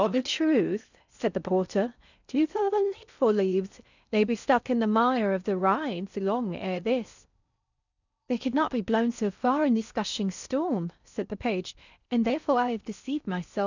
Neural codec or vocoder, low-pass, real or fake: codec, 16 kHz in and 24 kHz out, 0.6 kbps, FocalCodec, streaming, 4096 codes; 7.2 kHz; fake